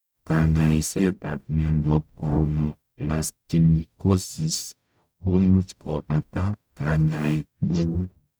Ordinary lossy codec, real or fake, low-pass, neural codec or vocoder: none; fake; none; codec, 44.1 kHz, 0.9 kbps, DAC